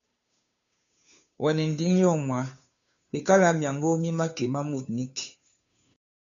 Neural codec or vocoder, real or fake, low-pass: codec, 16 kHz, 2 kbps, FunCodec, trained on Chinese and English, 25 frames a second; fake; 7.2 kHz